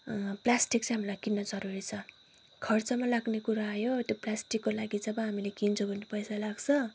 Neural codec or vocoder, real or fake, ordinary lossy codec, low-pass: none; real; none; none